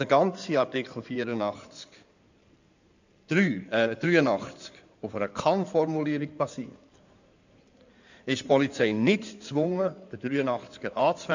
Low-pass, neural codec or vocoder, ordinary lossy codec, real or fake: 7.2 kHz; codec, 16 kHz in and 24 kHz out, 2.2 kbps, FireRedTTS-2 codec; none; fake